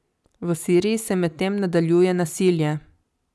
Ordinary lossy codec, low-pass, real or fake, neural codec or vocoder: none; none; real; none